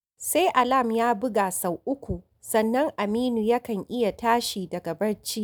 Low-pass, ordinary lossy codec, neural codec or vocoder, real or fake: none; none; none; real